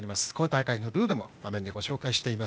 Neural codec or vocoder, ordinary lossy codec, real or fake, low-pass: codec, 16 kHz, 0.8 kbps, ZipCodec; none; fake; none